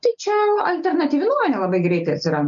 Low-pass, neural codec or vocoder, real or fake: 7.2 kHz; none; real